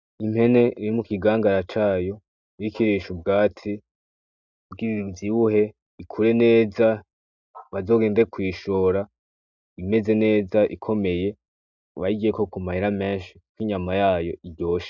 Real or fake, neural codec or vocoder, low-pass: real; none; 7.2 kHz